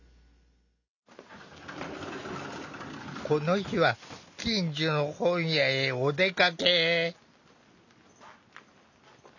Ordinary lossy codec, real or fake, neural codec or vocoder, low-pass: none; real; none; 7.2 kHz